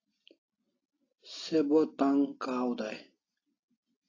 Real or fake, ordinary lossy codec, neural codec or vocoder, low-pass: real; AAC, 32 kbps; none; 7.2 kHz